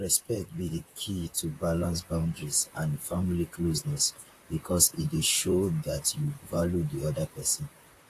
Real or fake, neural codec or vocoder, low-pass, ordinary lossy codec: fake; vocoder, 44.1 kHz, 128 mel bands, Pupu-Vocoder; 14.4 kHz; AAC, 48 kbps